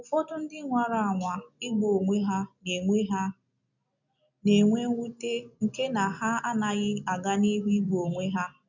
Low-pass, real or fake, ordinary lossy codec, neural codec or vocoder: 7.2 kHz; real; none; none